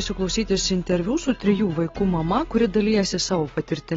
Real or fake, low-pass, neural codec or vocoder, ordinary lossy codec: real; 7.2 kHz; none; AAC, 24 kbps